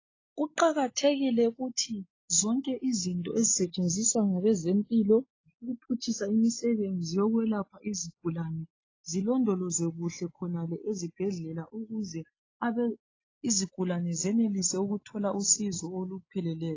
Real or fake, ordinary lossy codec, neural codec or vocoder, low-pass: real; AAC, 32 kbps; none; 7.2 kHz